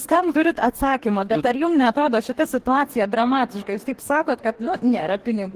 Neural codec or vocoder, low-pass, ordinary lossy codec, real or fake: codec, 44.1 kHz, 2.6 kbps, DAC; 14.4 kHz; Opus, 16 kbps; fake